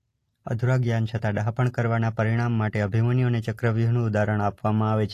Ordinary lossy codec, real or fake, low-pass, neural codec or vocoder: AAC, 48 kbps; real; 14.4 kHz; none